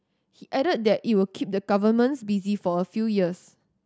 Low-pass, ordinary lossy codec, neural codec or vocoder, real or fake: none; none; none; real